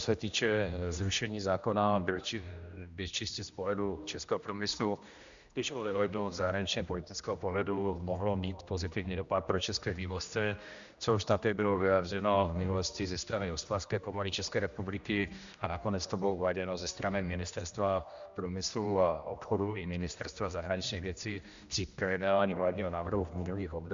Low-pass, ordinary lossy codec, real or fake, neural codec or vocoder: 7.2 kHz; Opus, 64 kbps; fake; codec, 16 kHz, 1 kbps, X-Codec, HuBERT features, trained on general audio